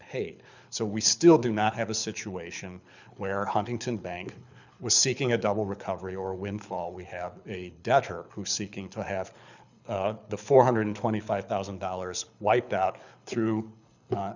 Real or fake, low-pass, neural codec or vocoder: fake; 7.2 kHz; codec, 24 kHz, 6 kbps, HILCodec